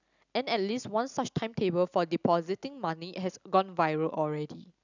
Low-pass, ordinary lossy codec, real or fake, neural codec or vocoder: 7.2 kHz; none; real; none